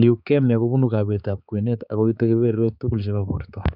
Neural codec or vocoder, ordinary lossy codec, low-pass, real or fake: codec, 16 kHz, 4 kbps, X-Codec, HuBERT features, trained on balanced general audio; none; 5.4 kHz; fake